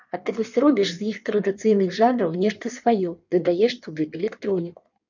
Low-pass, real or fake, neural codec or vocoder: 7.2 kHz; fake; codec, 16 kHz in and 24 kHz out, 1.1 kbps, FireRedTTS-2 codec